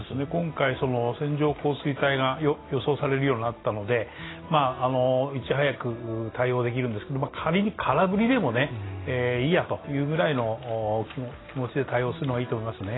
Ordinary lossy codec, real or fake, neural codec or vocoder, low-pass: AAC, 16 kbps; real; none; 7.2 kHz